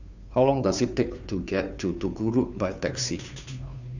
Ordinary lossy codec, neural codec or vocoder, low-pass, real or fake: none; codec, 16 kHz, 2 kbps, FunCodec, trained on Chinese and English, 25 frames a second; 7.2 kHz; fake